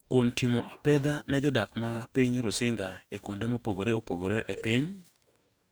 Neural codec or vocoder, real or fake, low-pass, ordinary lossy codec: codec, 44.1 kHz, 2.6 kbps, DAC; fake; none; none